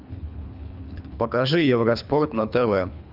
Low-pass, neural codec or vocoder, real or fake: 5.4 kHz; codec, 24 kHz, 3 kbps, HILCodec; fake